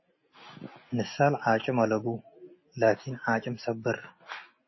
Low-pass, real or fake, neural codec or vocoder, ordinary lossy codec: 7.2 kHz; real; none; MP3, 24 kbps